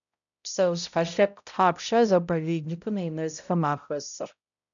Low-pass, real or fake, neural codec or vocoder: 7.2 kHz; fake; codec, 16 kHz, 0.5 kbps, X-Codec, HuBERT features, trained on balanced general audio